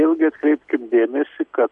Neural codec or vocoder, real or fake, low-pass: none; real; 10.8 kHz